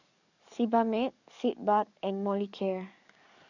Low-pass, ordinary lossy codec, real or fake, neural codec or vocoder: 7.2 kHz; none; fake; codec, 44.1 kHz, 7.8 kbps, DAC